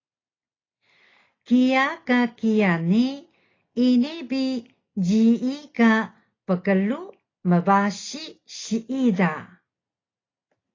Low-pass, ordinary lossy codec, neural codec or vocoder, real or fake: 7.2 kHz; AAC, 32 kbps; none; real